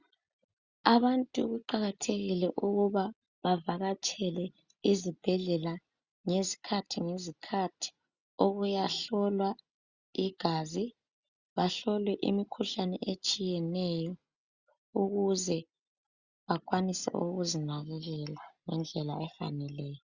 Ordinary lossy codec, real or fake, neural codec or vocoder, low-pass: Opus, 64 kbps; real; none; 7.2 kHz